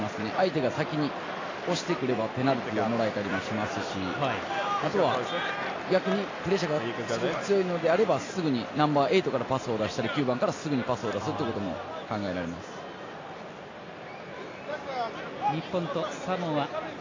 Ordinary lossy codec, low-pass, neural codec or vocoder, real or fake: AAC, 32 kbps; 7.2 kHz; none; real